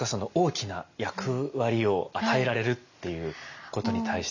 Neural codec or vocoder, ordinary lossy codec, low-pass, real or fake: none; none; 7.2 kHz; real